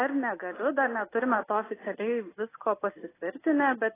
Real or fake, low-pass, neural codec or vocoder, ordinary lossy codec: real; 3.6 kHz; none; AAC, 16 kbps